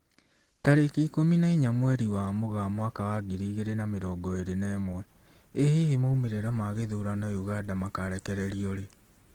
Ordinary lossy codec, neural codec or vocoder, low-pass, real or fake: Opus, 16 kbps; none; 19.8 kHz; real